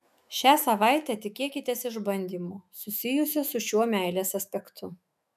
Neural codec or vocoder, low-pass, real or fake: autoencoder, 48 kHz, 128 numbers a frame, DAC-VAE, trained on Japanese speech; 14.4 kHz; fake